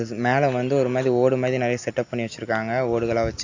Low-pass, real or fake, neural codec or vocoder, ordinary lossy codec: 7.2 kHz; real; none; AAC, 48 kbps